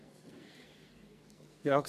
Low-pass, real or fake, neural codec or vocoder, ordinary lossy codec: 14.4 kHz; fake; codec, 44.1 kHz, 2.6 kbps, SNAC; none